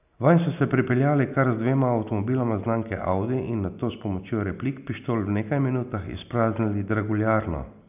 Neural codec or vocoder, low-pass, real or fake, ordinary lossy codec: none; 3.6 kHz; real; none